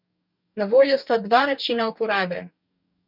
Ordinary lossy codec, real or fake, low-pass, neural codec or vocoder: none; fake; 5.4 kHz; codec, 44.1 kHz, 2.6 kbps, DAC